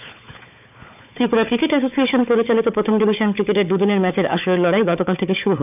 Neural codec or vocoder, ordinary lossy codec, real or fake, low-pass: codec, 16 kHz, 4 kbps, FunCodec, trained on Chinese and English, 50 frames a second; none; fake; 3.6 kHz